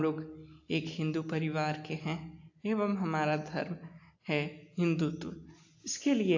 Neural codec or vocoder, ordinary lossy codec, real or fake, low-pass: none; AAC, 48 kbps; real; 7.2 kHz